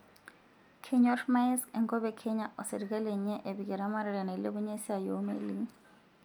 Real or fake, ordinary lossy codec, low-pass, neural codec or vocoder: real; none; 19.8 kHz; none